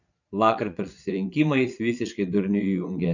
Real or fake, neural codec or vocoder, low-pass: fake; vocoder, 22.05 kHz, 80 mel bands, Vocos; 7.2 kHz